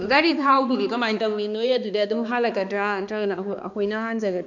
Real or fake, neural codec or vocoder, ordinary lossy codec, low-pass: fake; codec, 16 kHz, 2 kbps, X-Codec, HuBERT features, trained on balanced general audio; none; 7.2 kHz